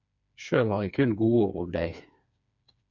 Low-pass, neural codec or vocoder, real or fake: 7.2 kHz; codec, 16 kHz, 4 kbps, FreqCodec, smaller model; fake